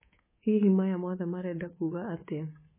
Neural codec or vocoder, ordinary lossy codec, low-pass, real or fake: codec, 24 kHz, 3.1 kbps, DualCodec; MP3, 16 kbps; 3.6 kHz; fake